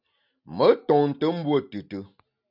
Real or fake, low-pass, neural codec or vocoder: real; 5.4 kHz; none